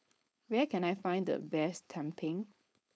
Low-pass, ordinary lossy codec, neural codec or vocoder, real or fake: none; none; codec, 16 kHz, 4.8 kbps, FACodec; fake